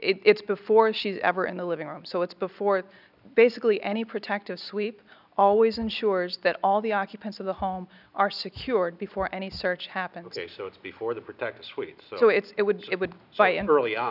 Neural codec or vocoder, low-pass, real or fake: none; 5.4 kHz; real